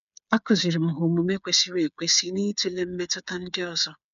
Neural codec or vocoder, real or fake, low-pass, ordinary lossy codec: codec, 16 kHz, 8 kbps, FreqCodec, smaller model; fake; 7.2 kHz; none